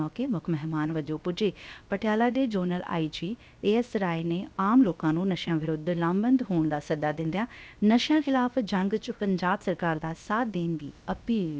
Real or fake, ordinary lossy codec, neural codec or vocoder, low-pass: fake; none; codec, 16 kHz, about 1 kbps, DyCAST, with the encoder's durations; none